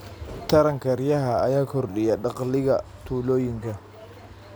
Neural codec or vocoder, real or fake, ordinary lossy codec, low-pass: none; real; none; none